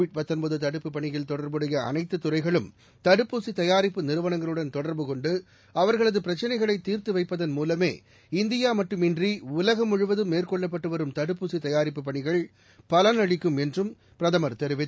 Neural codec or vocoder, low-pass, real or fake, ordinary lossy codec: none; none; real; none